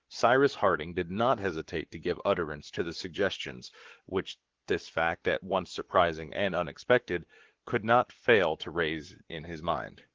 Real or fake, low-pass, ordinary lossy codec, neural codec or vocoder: fake; 7.2 kHz; Opus, 16 kbps; codec, 44.1 kHz, 7.8 kbps, Pupu-Codec